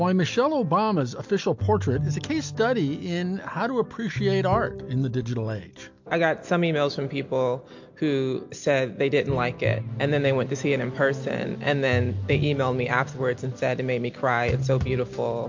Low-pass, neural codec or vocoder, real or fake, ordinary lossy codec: 7.2 kHz; none; real; MP3, 48 kbps